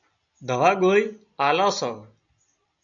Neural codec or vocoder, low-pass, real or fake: none; 7.2 kHz; real